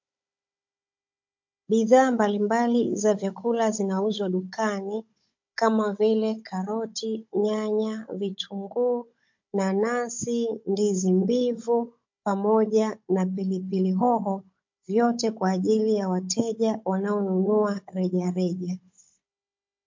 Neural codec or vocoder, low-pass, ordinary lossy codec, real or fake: codec, 16 kHz, 16 kbps, FunCodec, trained on Chinese and English, 50 frames a second; 7.2 kHz; MP3, 48 kbps; fake